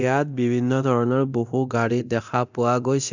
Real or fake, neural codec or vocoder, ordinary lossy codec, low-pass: fake; codec, 24 kHz, 0.9 kbps, DualCodec; none; 7.2 kHz